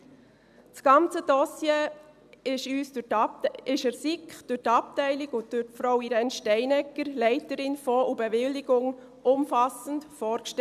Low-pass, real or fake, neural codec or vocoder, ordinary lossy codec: 14.4 kHz; real; none; none